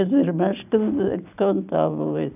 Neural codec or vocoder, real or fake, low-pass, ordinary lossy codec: none; real; 3.6 kHz; none